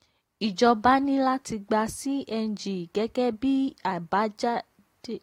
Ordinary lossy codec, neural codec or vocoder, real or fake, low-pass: AAC, 48 kbps; none; real; 19.8 kHz